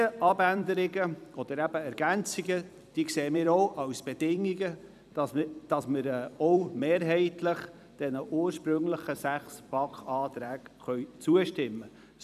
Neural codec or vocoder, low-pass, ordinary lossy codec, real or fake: vocoder, 44.1 kHz, 128 mel bands every 256 samples, BigVGAN v2; 14.4 kHz; none; fake